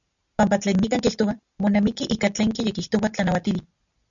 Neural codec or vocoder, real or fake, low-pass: none; real; 7.2 kHz